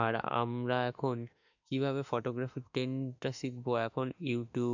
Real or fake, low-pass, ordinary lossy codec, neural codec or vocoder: fake; 7.2 kHz; none; autoencoder, 48 kHz, 32 numbers a frame, DAC-VAE, trained on Japanese speech